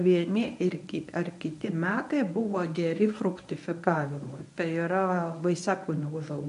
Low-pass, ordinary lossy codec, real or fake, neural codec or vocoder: 10.8 kHz; AAC, 96 kbps; fake; codec, 24 kHz, 0.9 kbps, WavTokenizer, medium speech release version 1